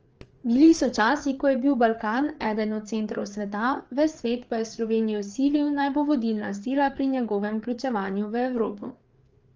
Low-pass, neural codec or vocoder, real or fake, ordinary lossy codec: 7.2 kHz; codec, 16 kHz, 4 kbps, FreqCodec, larger model; fake; Opus, 24 kbps